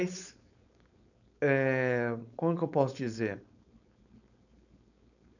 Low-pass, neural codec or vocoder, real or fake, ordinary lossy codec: 7.2 kHz; codec, 16 kHz, 4.8 kbps, FACodec; fake; none